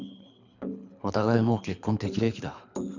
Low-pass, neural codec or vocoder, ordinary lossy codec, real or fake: 7.2 kHz; codec, 24 kHz, 3 kbps, HILCodec; none; fake